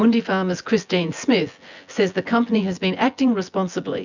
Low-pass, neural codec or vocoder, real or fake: 7.2 kHz; vocoder, 24 kHz, 100 mel bands, Vocos; fake